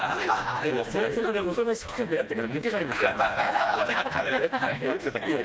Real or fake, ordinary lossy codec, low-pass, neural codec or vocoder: fake; none; none; codec, 16 kHz, 1 kbps, FreqCodec, smaller model